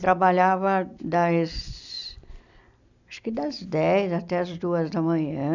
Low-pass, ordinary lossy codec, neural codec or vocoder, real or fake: 7.2 kHz; none; none; real